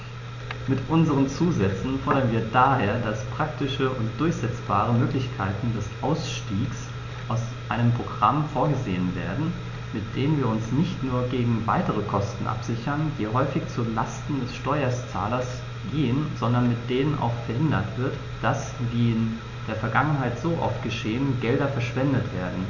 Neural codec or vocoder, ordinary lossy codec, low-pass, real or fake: none; none; 7.2 kHz; real